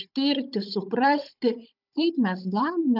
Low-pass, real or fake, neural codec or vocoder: 5.4 kHz; fake; codec, 16 kHz, 16 kbps, FunCodec, trained on Chinese and English, 50 frames a second